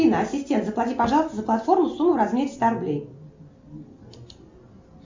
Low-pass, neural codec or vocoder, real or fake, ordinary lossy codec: 7.2 kHz; none; real; MP3, 64 kbps